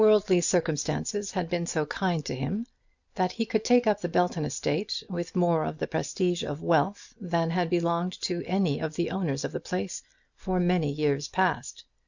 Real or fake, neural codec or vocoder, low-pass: real; none; 7.2 kHz